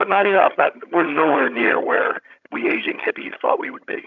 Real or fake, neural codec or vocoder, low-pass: fake; vocoder, 22.05 kHz, 80 mel bands, HiFi-GAN; 7.2 kHz